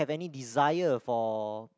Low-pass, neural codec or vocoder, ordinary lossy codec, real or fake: none; none; none; real